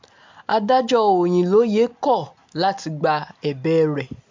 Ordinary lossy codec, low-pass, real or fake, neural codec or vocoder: MP3, 64 kbps; 7.2 kHz; real; none